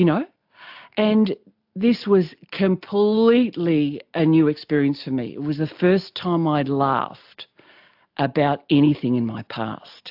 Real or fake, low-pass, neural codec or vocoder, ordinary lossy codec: real; 5.4 kHz; none; AAC, 48 kbps